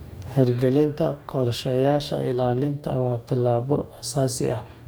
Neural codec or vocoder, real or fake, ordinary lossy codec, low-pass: codec, 44.1 kHz, 2.6 kbps, DAC; fake; none; none